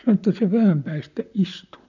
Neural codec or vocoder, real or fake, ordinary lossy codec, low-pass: vocoder, 44.1 kHz, 128 mel bands, Pupu-Vocoder; fake; none; 7.2 kHz